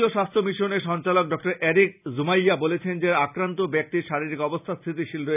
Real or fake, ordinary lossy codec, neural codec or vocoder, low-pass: real; none; none; 3.6 kHz